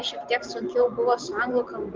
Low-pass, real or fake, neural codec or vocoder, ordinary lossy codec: 7.2 kHz; real; none; Opus, 32 kbps